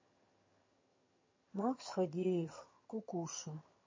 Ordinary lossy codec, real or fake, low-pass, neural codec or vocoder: MP3, 32 kbps; fake; 7.2 kHz; vocoder, 22.05 kHz, 80 mel bands, HiFi-GAN